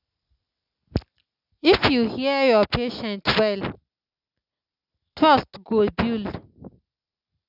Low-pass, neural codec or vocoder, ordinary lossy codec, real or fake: 5.4 kHz; none; none; real